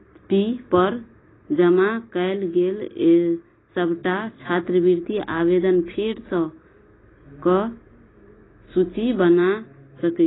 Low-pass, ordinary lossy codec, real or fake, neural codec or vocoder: 7.2 kHz; AAC, 16 kbps; real; none